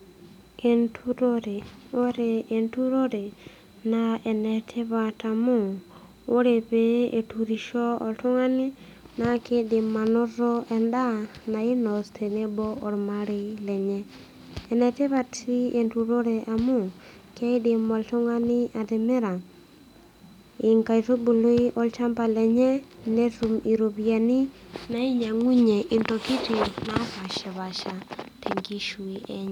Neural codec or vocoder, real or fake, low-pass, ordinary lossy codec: none; real; 19.8 kHz; none